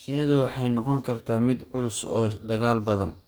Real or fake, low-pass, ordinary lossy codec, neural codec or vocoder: fake; none; none; codec, 44.1 kHz, 2.6 kbps, DAC